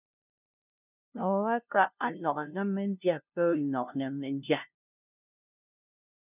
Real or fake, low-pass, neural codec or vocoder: fake; 3.6 kHz; codec, 16 kHz, 0.5 kbps, FunCodec, trained on LibriTTS, 25 frames a second